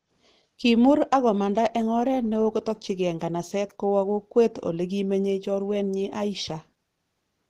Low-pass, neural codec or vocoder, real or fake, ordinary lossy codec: 14.4 kHz; none; real; Opus, 16 kbps